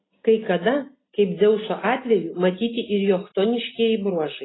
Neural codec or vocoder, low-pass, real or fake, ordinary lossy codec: none; 7.2 kHz; real; AAC, 16 kbps